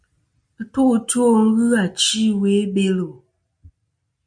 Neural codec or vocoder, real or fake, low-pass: none; real; 9.9 kHz